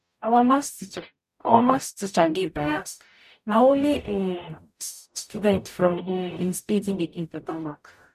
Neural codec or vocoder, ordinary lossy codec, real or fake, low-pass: codec, 44.1 kHz, 0.9 kbps, DAC; none; fake; 14.4 kHz